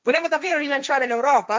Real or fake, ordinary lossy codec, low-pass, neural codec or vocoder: fake; none; none; codec, 16 kHz, 1.1 kbps, Voila-Tokenizer